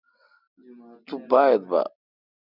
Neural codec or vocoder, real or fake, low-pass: none; real; 5.4 kHz